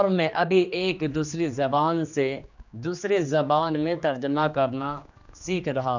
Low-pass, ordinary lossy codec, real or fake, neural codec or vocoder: 7.2 kHz; none; fake; codec, 16 kHz, 2 kbps, X-Codec, HuBERT features, trained on general audio